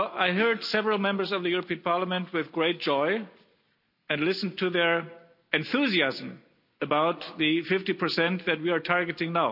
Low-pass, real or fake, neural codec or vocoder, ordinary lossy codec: 5.4 kHz; real; none; none